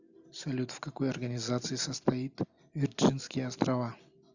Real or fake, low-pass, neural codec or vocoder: real; 7.2 kHz; none